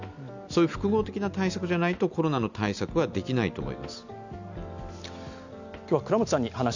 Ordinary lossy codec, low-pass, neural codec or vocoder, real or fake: none; 7.2 kHz; none; real